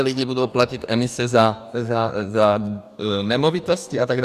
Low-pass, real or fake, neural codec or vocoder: 14.4 kHz; fake; codec, 44.1 kHz, 2.6 kbps, DAC